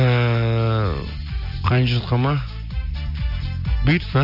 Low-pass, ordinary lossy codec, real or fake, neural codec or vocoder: 5.4 kHz; none; real; none